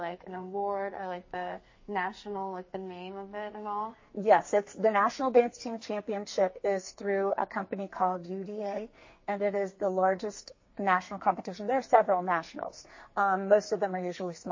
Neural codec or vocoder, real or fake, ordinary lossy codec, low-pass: codec, 44.1 kHz, 2.6 kbps, SNAC; fake; MP3, 32 kbps; 7.2 kHz